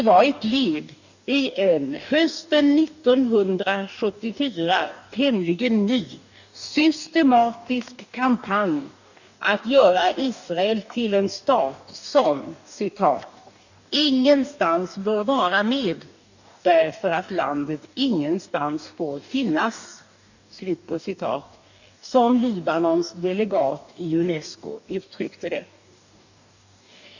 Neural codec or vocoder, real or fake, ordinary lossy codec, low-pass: codec, 44.1 kHz, 2.6 kbps, DAC; fake; none; 7.2 kHz